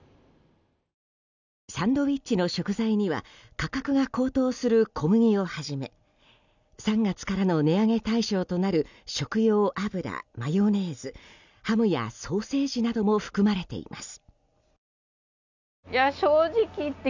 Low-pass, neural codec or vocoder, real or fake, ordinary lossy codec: 7.2 kHz; none; real; none